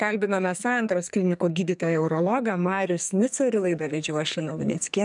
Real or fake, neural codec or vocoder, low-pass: fake; codec, 44.1 kHz, 2.6 kbps, SNAC; 10.8 kHz